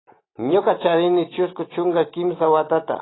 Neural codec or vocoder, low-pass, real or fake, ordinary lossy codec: none; 7.2 kHz; real; AAC, 16 kbps